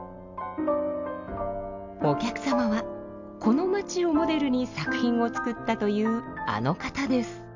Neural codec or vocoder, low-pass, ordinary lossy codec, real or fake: none; 7.2 kHz; MP3, 64 kbps; real